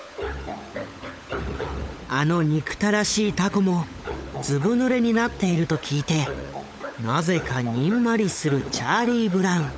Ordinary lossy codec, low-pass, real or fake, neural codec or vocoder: none; none; fake; codec, 16 kHz, 16 kbps, FunCodec, trained on LibriTTS, 50 frames a second